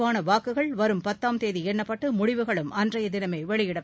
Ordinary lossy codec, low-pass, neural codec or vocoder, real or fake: none; none; none; real